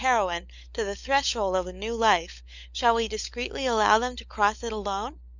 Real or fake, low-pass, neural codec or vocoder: fake; 7.2 kHz; codec, 16 kHz, 16 kbps, FunCodec, trained on LibriTTS, 50 frames a second